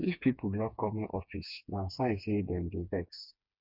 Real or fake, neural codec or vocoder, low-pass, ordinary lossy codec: fake; codec, 16 kHz, 4 kbps, FreqCodec, smaller model; 5.4 kHz; none